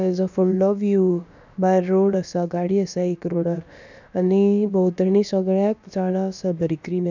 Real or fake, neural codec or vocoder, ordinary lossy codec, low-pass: fake; codec, 16 kHz, about 1 kbps, DyCAST, with the encoder's durations; none; 7.2 kHz